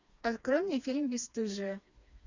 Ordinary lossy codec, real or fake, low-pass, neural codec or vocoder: Opus, 64 kbps; fake; 7.2 kHz; codec, 16 kHz, 2 kbps, FreqCodec, smaller model